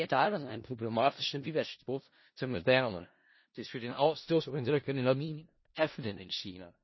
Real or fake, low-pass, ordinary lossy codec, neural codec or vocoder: fake; 7.2 kHz; MP3, 24 kbps; codec, 16 kHz in and 24 kHz out, 0.4 kbps, LongCat-Audio-Codec, four codebook decoder